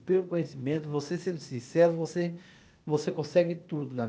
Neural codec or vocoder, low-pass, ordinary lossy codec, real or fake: codec, 16 kHz, 0.8 kbps, ZipCodec; none; none; fake